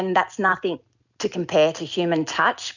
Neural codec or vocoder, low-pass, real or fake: none; 7.2 kHz; real